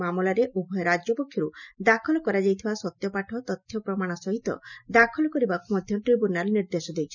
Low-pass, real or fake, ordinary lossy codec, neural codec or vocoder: 7.2 kHz; real; none; none